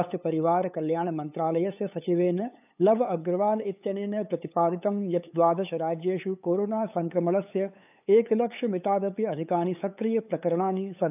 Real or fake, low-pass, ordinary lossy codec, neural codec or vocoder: fake; 3.6 kHz; none; codec, 16 kHz, 8 kbps, FunCodec, trained on LibriTTS, 25 frames a second